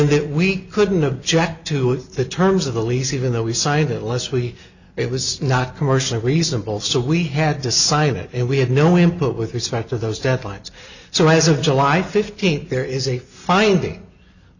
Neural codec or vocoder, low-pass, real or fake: none; 7.2 kHz; real